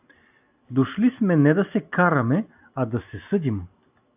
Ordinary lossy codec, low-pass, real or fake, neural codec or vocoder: AAC, 32 kbps; 3.6 kHz; real; none